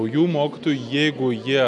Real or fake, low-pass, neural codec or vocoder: real; 10.8 kHz; none